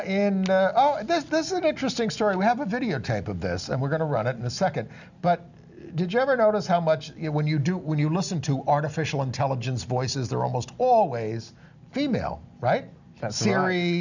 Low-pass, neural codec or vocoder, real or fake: 7.2 kHz; none; real